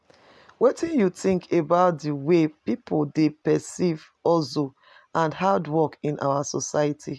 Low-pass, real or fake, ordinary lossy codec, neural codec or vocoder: none; real; none; none